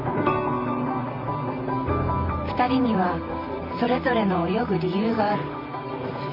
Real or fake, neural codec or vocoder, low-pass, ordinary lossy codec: fake; vocoder, 44.1 kHz, 128 mel bands, Pupu-Vocoder; 5.4 kHz; none